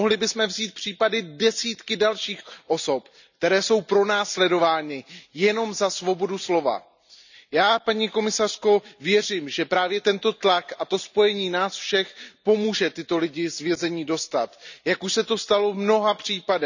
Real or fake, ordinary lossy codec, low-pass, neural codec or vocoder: real; none; 7.2 kHz; none